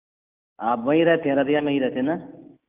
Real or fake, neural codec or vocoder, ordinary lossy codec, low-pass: real; none; Opus, 24 kbps; 3.6 kHz